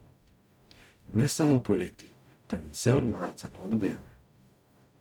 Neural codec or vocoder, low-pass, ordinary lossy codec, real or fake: codec, 44.1 kHz, 0.9 kbps, DAC; 19.8 kHz; none; fake